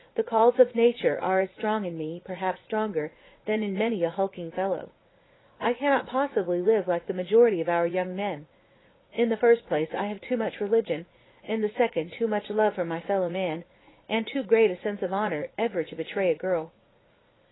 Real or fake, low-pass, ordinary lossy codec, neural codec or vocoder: fake; 7.2 kHz; AAC, 16 kbps; vocoder, 44.1 kHz, 80 mel bands, Vocos